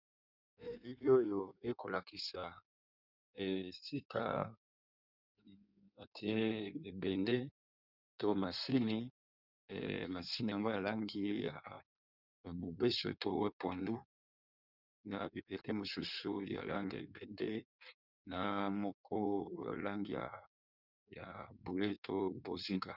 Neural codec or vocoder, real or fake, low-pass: codec, 16 kHz in and 24 kHz out, 1.1 kbps, FireRedTTS-2 codec; fake; 5.4 kHz